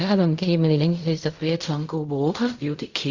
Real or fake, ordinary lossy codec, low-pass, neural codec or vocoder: fake; Opus, 64 kbps; 7.2 kHz; codec, 16 kHz in and 24 kHz out, 0.4 kbps, LongCat-Audio-Codec, fine tuned four codebook decoder